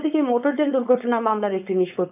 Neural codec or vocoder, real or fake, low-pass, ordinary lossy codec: codec, 16 kHz, 4.8 kbps, FACodec; fake; 3.6 kHz; none